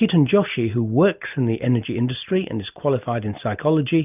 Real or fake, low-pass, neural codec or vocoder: real; 3.6 kHz; none